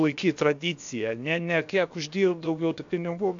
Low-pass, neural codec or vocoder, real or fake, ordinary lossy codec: 7.2 kHz; codec, 16 kHz, about 1 kbps, DyCAST, with the encoder's durations; fake; AAC, 64 kbps